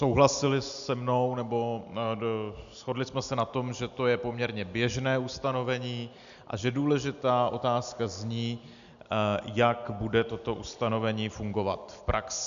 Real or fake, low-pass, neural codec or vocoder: real; 7.2 kHz; none